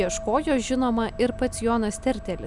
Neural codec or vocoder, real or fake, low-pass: none; real; 10.8 kHz